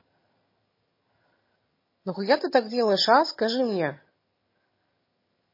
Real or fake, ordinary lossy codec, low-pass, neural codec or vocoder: fake; MP3, 24 kbps; 5.4 kHz; vocoder, 22.05 kHz, 80 mel bands, HiFi-GAN